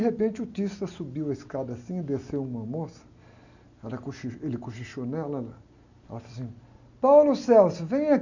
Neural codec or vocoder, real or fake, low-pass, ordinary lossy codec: none; real; 7.2 kHz; none